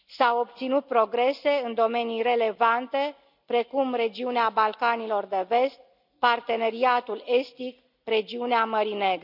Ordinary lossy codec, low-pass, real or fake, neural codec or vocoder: none; 5.4 kHz; real; none